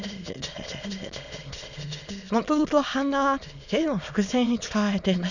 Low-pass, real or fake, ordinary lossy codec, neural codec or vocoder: 7.2 kHz; fake; none; autoencoder, 22.05 kHz, a latent of 192 numbers a frame, VITS, trained on many speakers